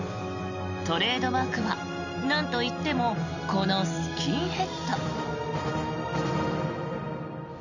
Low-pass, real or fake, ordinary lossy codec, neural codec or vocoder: 7.2 kHz; real; none; none